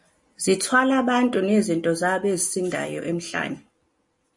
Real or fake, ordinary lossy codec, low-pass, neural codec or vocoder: real; MP3, 48 kbps; 10.8 kHz; none